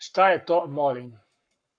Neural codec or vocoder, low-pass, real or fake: codec, 44.1 kHz, 7.8 kbps, Pupu-Codec; 9.9 kHz; fake